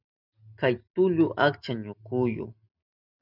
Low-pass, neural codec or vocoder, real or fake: 5.4 kHz; none; real